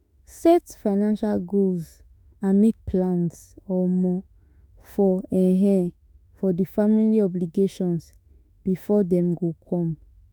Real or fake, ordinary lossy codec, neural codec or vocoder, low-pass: fake; none; autoencoder, 48 kHz, 32 numbers a frame, DAC-VAE, trained on Japanese speech; none